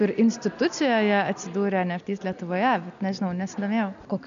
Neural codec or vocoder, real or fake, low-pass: none; real; 7.2 kHz